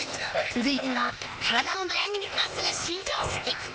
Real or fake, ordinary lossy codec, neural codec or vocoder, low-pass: fake; none; codec, 16 kHz, 0.8 kbps, ZipCodec; none